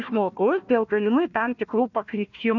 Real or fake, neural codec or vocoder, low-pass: fake; codec, 16 kHz, 1 kbps, FunCodec, trained on Chinese and English, 50 frames a second; 7.2 kHz